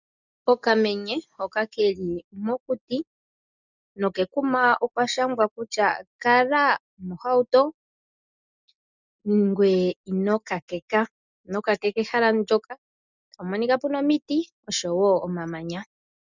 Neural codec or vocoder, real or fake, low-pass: none; real; 7.2 kHz